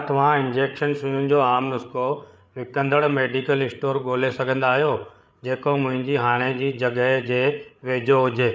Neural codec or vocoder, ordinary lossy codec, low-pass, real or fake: codec, 16 kHz, 8 kbps, FreqCodec, larger model; none; none; fake